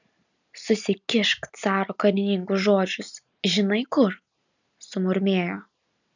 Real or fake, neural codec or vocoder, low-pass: real; none; 7.2 kHz